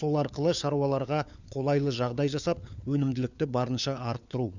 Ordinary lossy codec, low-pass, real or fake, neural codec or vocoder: none; 7.2 kHz; fake; codec, 16 kHz, 4 kbps, FunCodec, trained on LibriTTS, 50 frames a second